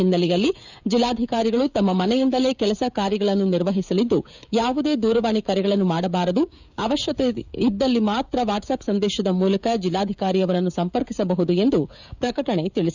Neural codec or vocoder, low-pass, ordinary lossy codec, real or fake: codec, 16 kHz, 16 kbps, FreqCodec, smaller model; 7.2 kHz; none; fake